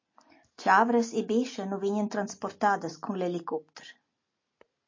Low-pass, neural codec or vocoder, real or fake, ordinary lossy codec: 7.2 kHz; none; real; MP3, 32 kbps